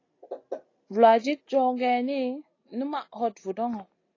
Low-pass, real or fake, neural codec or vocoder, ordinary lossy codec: 7.2 kHz; real; none; AAC, 32 kbps